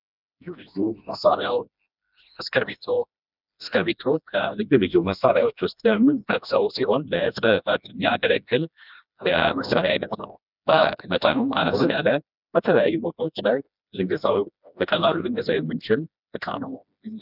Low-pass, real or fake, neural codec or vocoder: 5.4 kHz; fake; codec, 16 kHz, 1 kbps, FreqCodec, smaller model